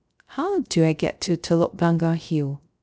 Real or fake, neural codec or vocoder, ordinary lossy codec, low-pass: fake; codec, 16 kHz, 0.3 kbps, FocalCodec; none; none